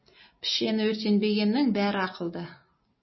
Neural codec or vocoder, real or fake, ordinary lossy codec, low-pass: none; real; MP3, 24 kbps; 7.2 kHz